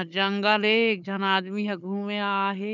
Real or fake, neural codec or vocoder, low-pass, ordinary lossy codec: fake; codec, 16 kHz, 4 kbps, FunCodec, trained on Chinese and English, 50 frames a second; 7.2 kHz; none